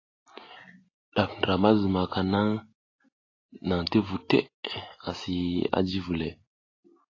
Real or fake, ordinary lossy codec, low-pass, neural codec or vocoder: real; AAC, 32 kbps; 7.2 kHz; none